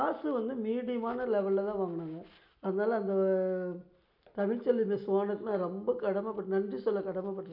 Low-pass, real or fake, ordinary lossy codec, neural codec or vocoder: 5.4 kHz; real; none; none